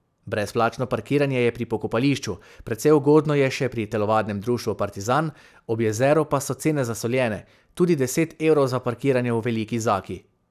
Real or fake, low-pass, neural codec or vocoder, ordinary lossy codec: real; 14.4 kHz; none; none